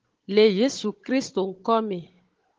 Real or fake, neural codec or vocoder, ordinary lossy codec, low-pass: fake; codec, 16 kHz, 16 kbps, FunCodec, trained on Chinese and English, 50 frames a second; Opus, 16 kbps; 7.2 kHz